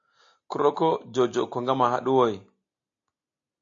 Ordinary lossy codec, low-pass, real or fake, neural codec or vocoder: AAC, 48 kbps; 7.2 kHz; real; none